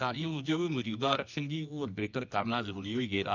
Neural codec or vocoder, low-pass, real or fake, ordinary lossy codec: codec, 24 kHz, 0.9 kbps, WavTokenizer, medium music audio release; 7.2 kHz; fake; none